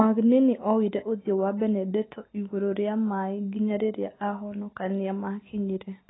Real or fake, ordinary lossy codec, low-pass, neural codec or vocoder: real; AAC, 16 kbps; 7.2 kHz; none